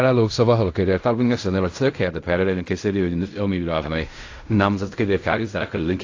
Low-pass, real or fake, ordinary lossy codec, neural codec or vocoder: 7.2 kHz; fake; AAC, 48 kbps; codec, 16 kHz in and 24 kHz out, 0.4 kbps, LongCat-Audio-Codec, fine tuned four codebook decoder